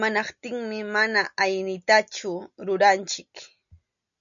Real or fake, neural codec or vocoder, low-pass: real; none; 7.2 kHz